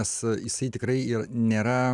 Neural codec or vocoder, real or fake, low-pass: none; real; 10.8 kHz